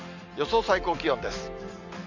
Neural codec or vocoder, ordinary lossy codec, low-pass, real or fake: none; none; 7.2 kHz; real